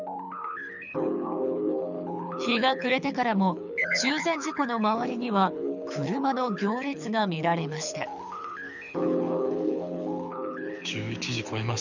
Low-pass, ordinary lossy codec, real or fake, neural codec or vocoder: 7.2 kHz; none; fake; codec, 24 kHz, 6 kbps, HILCodec